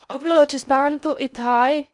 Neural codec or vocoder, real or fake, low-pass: codec, 16 kHz in and 24 kHz out, 0.6 kbps, FocalCodec, streaming, 4096 codes; fake; 10.8 kHz